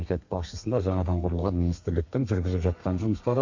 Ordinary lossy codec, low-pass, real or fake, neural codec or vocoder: AAC, 48 kbps; 7.2 kHz; fake; codec, 32 kHz, 1.9 kbps, SNAC